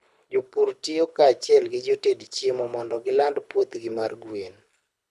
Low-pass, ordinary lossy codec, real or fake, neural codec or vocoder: none; none; fake; codec, 24 kHz, 6 kbps, HILCodec